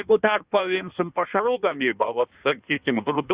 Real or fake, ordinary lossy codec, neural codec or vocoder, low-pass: fake; Opus, 64 kbps; codec, 16 kHz in and 24 kHz out, 1.1 kbps, FireRedTTS-2 codec; 3.6 kHz